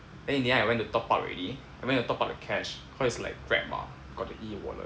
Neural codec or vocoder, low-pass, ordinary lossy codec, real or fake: none; none; none; real